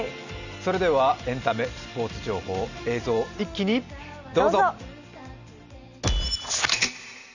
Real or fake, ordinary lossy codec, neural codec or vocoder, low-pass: real; none; none; 7.2 kHz